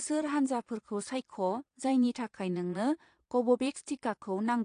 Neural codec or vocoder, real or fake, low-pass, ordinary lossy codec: vocoder, 22.05 kHz, 80 mel bands, Vocos; fake; 9.9 kHz; AAC, 48 kbps